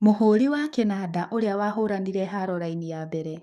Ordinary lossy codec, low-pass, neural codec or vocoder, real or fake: none; 14.4 kHz; codec, 44.1 kHz, 7.8 kbps, DAC; fake